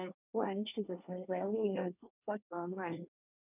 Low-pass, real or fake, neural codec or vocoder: 3.6 kHz; fake; codec, 24 kHz, 1 kbps, SNAC